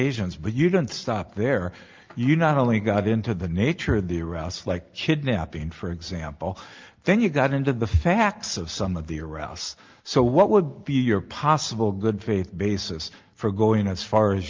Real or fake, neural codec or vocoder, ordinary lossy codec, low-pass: real; none; Opus, 32 kbps; 7.2 kHz